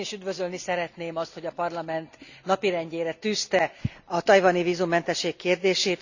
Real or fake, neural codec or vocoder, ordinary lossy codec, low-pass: real; none; none; 7.2 kHz